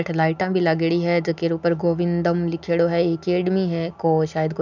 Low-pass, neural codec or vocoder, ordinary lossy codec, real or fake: 7.2 kHz; none; none; real